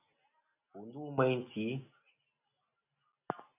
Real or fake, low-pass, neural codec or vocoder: real; 3.6 kHz; none